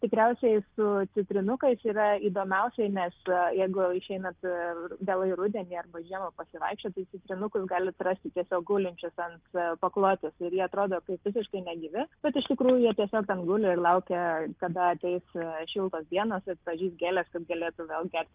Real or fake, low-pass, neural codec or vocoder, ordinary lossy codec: real; 3.6 kHz; none; Opus, 32 kbps